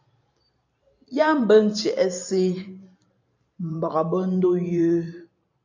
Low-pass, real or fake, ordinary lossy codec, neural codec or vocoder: 7.2 kHz; fake; AAC, 48 kbps; vocoder, 44.1 kHz, 128 mel bands every 512 samples, BigVGAN v2